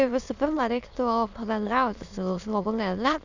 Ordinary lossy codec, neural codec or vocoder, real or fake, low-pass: Opus, 64 kbps; autoencoder, 22.05 kHz, a latent of 192 numbers a frame, VITS, trained on many speakers; fake; 7.2 kHz